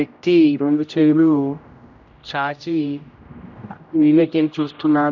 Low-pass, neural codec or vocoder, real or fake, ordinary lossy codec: 7.2 kHz; codec, 16 kHz, 0.5 kbps, X-Codec, HuBERT features, trained on general audio; fake; none